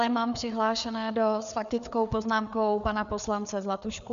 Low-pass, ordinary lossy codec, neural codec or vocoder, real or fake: 7.2 kHz; MP3, 64 kbps; codec, 16 kHz, 4 kbps, FreqCodec, larger model; fake